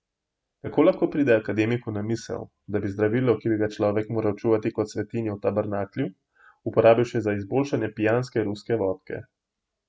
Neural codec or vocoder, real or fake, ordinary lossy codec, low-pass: none; real; none; none